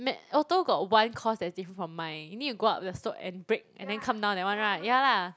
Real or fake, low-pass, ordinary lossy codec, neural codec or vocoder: real; none; none; none